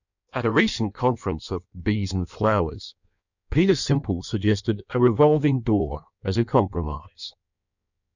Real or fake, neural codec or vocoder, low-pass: fake; codec, 16 kHz in and 24 kHz out, 1.1 kbps, FireRedTTS-2 codec; 7.2 kHz